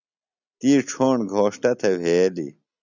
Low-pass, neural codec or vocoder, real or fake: 7.2 kHz; none; real